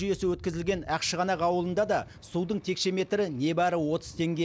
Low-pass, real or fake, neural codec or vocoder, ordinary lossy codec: none; real; none; none